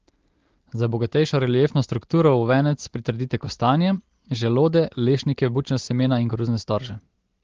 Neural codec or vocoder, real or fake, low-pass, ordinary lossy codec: none; real; 7.2 kHz; Opus, 16 kbps